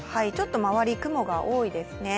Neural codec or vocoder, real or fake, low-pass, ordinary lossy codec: none; real; none; none